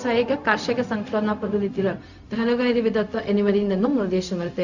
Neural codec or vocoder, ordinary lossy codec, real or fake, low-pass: codec, 16 kHz, 0.4 kbps, LongCat-Audio-Codec; none; fake; 7.2 kHz